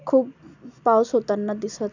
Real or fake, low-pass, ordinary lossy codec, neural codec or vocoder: real; 7.2 kHz; none; none